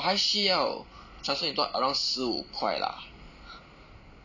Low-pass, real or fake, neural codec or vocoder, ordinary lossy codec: 7.2 kHz; real; none; none